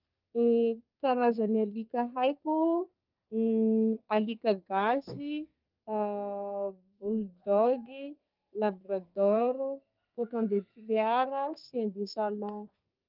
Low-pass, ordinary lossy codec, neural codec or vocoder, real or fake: 5.4 kHz; Opus, 24 kbps; codec, 32 kHz, 1.9 kbps, SNAC; fake